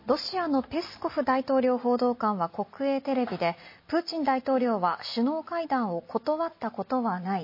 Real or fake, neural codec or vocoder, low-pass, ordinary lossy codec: real; none; 5.4 kHz; MP3, 24 kbps